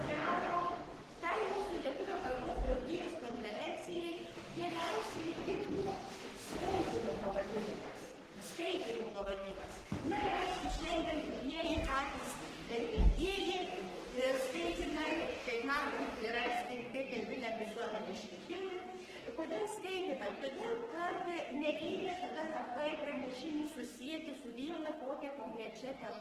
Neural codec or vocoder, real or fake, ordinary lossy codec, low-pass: codec, 44.1 kHz, 3.4 kbps, Pupu-Codec; fake; Opus, 16 kbps; 14.4 kHz